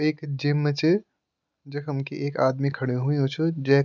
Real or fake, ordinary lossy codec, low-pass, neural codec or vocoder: real; none; 7.2 kHz; none